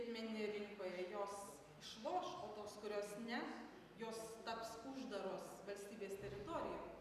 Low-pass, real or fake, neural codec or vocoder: 14.4 kHz; real; none